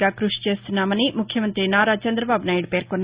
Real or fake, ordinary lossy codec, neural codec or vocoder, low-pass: real; Opus, 64 kbps; none; 3.6 kHz